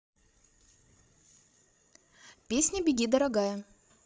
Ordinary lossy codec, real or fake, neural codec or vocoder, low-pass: none; fake; codec, 16 kHz, 16 kbps, FreqCodec, larger model; none